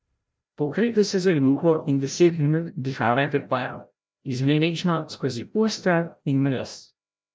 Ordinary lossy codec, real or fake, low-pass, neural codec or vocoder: none; fake; none; codec, 16 kHz, 0.5 kbps, FreqCodec, larger model